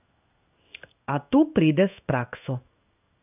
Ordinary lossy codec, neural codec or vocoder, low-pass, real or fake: none; codec, 16 kHz in and 24 kHz out, 1 kbps, XY-Tokenizer; 3.6 kHz; fake